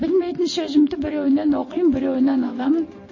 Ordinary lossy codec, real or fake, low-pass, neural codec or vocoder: MP3, 32 kbps; real; 7.2 kHz; none